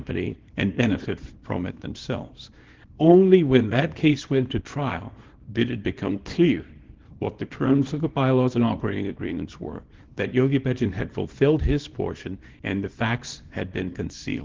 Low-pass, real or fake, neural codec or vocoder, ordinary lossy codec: 7.2 kHz; fake; codec, 24 kHz, 0.9 kbps, WavTokenizer, small release; Opus, 16 kbps